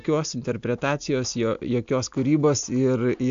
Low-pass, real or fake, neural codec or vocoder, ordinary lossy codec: 7.2 kHz; real; none; AAC, 64 kbps